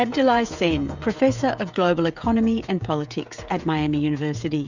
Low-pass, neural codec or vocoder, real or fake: 7.2 kHz; codec, 16 kHz, 16 kbps, FreqCodec, smaller model; fake